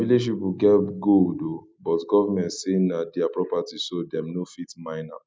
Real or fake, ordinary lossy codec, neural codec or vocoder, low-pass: real; none; none; none